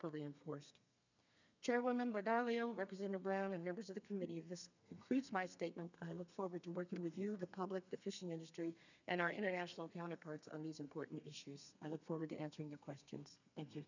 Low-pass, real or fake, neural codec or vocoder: 7.2 kHz; fake; codec, 32 kHz, 1.9 kbps, SNAC